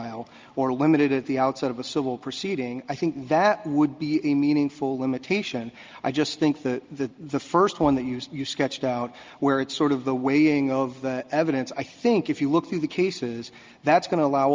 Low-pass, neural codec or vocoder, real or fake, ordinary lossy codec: 7.2 kHz; none; real; Opus, 24 kbps